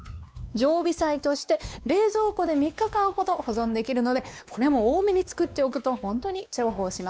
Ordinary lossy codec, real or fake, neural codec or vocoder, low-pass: none; fake; codec, 16 kHz, 2 kbps, X-Codec, WavLM features, trained on Multilingual LibriSpeech; none